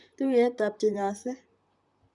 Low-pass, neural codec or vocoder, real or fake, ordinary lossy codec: 10.8 kHz; vocoder, 44.1 kHz, 128 mel bands, Pupu-Vocoder; fake; none